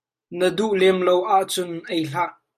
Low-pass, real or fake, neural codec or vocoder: 14.4 kHz; real; none